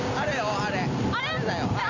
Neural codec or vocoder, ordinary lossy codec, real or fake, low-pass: none; none; real; 7.2 kHz